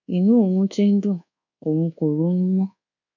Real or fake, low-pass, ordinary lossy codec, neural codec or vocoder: fake; 7.2 kHz; none; codec, 24 kHz, 1.2 kbps, DualCodec